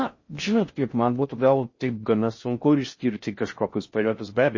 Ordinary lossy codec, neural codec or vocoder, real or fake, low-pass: MP3, 32 kbps; codec, 16 kHz in and 24 kHz out, 0.6 kbps, FocalCodec, streaming, 4096 codes; fake; 7.2 kHz